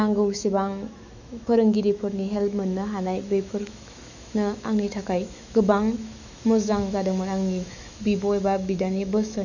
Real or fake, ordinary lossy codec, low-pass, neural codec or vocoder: fake; none; 7.2 kHz; autoencoder, 48 kHz, 128 numbers a frame, DAC-VAE, trained on Japanese speech